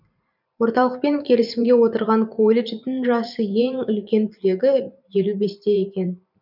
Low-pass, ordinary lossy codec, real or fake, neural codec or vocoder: 5.4 kHz; none; fake; vocoder, 44.1 kHz, 128 mel bands every 512 samples, BigVGAN v2